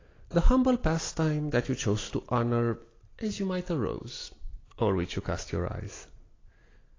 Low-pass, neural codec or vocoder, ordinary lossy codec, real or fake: 7.2 kHz; none; AAC, 32 kbps; real